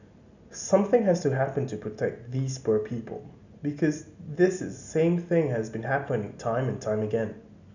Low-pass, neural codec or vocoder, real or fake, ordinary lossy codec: 7.2 kHz; none; real; none